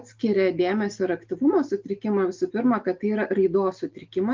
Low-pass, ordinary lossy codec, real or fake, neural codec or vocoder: 7.2 kHz; Opus, 24 kbps; real; none